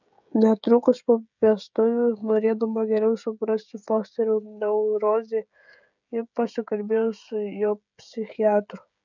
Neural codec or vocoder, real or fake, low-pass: codec, 16 kHz, 16 kbps, FreqCodec, smaller model; fake; 7.2 kHz